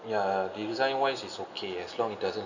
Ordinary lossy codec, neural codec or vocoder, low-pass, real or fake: none; none; 7.2 kHz; real